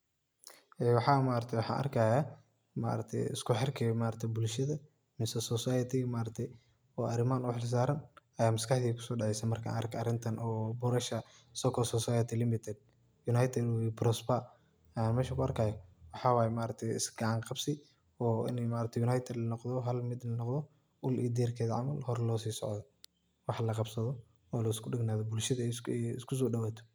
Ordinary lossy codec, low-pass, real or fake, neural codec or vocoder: none; none; real; none